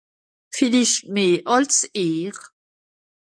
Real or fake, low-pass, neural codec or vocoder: fake; 9.9 kHz; codec, 44.1 kHz, 7.8 kbps, DAC